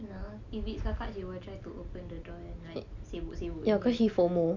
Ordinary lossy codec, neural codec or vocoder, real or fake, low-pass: none; none; real; 7.2 kHz